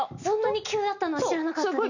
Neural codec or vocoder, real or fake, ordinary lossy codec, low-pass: none; real; none; 7.2 kHz